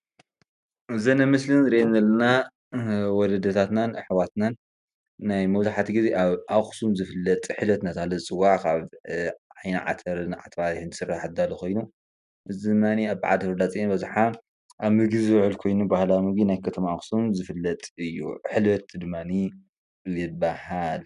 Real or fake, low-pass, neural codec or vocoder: real; 10.8 kHz; none